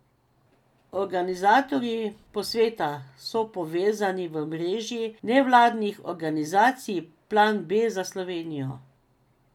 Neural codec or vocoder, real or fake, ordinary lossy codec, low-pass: none; real; none; 19.8 kHz